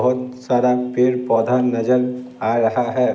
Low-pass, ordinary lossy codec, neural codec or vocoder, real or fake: none; none; none; real